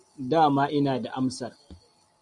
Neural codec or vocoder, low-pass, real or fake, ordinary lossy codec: none; 10.8 kHz; real; MP3, 96 kbps